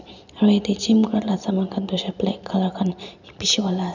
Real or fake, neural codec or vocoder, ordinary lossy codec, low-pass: real; none; none; 7.2 kHz